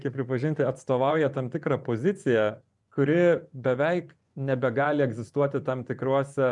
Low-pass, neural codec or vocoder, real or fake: 10.8 kHz; vocoder, 24 kHz, 100 mel bands, Vocos; fake